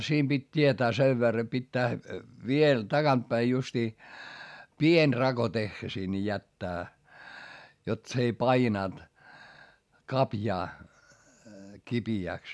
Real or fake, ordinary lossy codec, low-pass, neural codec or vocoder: real; none; none; none